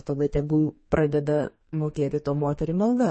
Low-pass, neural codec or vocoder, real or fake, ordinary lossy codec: 10.8 kHz; codec, 32 kHz, 1.9 kbps, SNAC; fake; MP3, 32 kbps